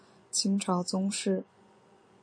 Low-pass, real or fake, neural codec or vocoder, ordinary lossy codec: 9.9 kHz; fake; vocoder, 24 kHz, 100 mel bands, Vocos; MP3, 48 kbps